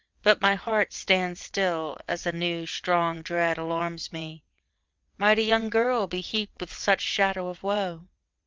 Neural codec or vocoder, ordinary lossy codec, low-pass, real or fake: vocoder, 22.05 kHz, 80 mel bands, WaveNeXt; Opus, 24 kbps; 7.2 kHz; fake